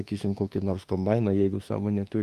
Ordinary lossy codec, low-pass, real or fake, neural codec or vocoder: Opus, 32 kbps; 14.4 kHz; fake; autoencoder, 48 kHz, 32 numbers a frame, DAC-VAE, trained on Japanese speech